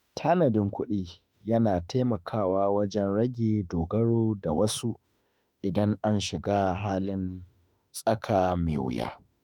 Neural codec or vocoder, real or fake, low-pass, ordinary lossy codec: autoencoder, 48 kHz, 32 numbers a frame, DAC-VAE, trained on Japanese speech; fake; 19.8 kHz; none